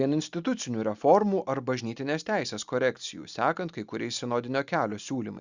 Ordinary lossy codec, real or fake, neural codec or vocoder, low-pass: Opus, 64 kbps; real; none; 7.2 kHz